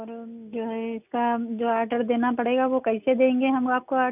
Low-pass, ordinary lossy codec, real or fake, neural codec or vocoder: 3.6 kHz; none; real; none